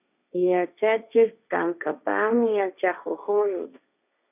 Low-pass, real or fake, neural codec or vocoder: 3.6 kHz; fake; codec, 16 kHz, 1.1 kbps, Voila-Tokenizer